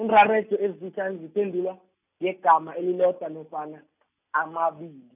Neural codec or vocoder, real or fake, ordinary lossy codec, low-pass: none; real; none; 3.6 kHz